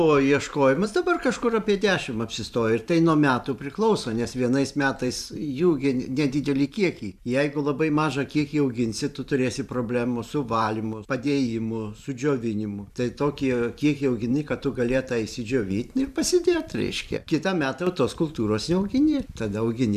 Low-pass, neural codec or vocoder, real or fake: 14.4 kHz; vocoder, 44.1 kHz, 128 mel bands every 512 samples, BigVGAN v2; fake